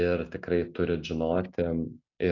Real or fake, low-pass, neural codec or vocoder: real; 7.2 kHz; none